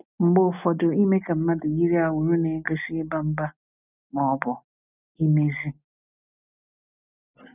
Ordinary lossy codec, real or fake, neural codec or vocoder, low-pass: none; real; none; 3.6 kHz